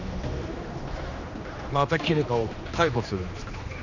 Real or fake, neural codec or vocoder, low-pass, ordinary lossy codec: fake; codec, 16 kHz, 2 kbps, X-Codec, HuBERT features, trained on general audio; 7.2 kHz; none